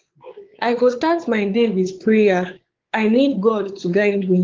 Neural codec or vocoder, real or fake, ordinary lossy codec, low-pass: codec, 16 kHz, 4 kbps, X-Codec, WavLM features, trained on Multilingual LibriSpeech; fake; Opus, 16 kbps; 7.2 kHz